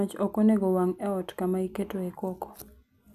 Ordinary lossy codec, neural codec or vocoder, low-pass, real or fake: none; none; 14.4 kHz; real